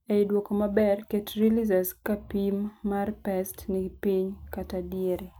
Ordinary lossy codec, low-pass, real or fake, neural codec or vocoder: none; none; real; none